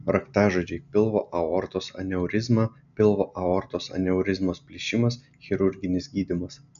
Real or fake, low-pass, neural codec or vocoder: real; 7.2 kHz; none